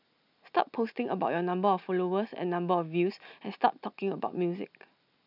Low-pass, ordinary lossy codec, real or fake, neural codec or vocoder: 5.4 kHz; none; real; none